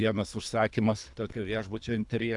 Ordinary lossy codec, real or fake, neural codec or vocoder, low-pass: AAC, 64 kbps; fake; codec, 24 kHz, 3 kbps, HILCodec; 10.8 kHz